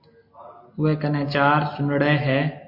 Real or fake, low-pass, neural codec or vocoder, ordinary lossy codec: real; 5.4 kHz; none; MP3, 32 kbps